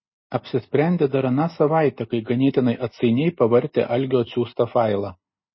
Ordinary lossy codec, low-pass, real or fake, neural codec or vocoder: MP3, 24 kbps; 7.2 kHz; real; none